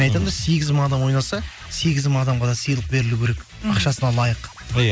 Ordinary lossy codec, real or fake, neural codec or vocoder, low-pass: none; real; none; none